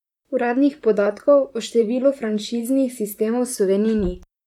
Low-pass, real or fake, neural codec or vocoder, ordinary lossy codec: 19.8 kHz; fake; vocoder, 44.1 kHz, 128 mel bands, Pupu-Vocoder; none